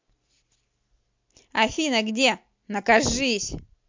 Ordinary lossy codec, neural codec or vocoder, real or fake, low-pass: MP3, 48 kbps; none; real; 7.2 kHz